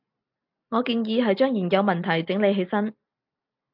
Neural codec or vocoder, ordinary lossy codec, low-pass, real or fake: none; MP3, 48 kbps; 5.4 kHz; real